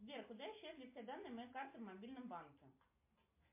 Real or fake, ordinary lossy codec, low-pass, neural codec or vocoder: real; MP3, 24 kbps; 3.6 kHz; none